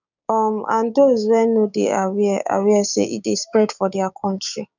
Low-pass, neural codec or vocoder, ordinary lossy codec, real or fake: 7.2 kHz; codec, 16 kHz, 6 kbps, DAC; none; fake